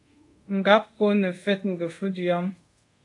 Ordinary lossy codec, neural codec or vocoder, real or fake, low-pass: AAC, 48 kbps; codec, 24 kHz, 0.5 kbps, DualCodec; fake; 10.8 kHz